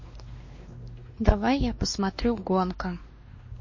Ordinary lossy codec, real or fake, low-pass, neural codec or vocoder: MP3, 32 kbps; fake; 7.2 kHz; codec, 16 kHz, 2 kbps, X-Codec, WavLM features, trained on Multilingual LibriSpeech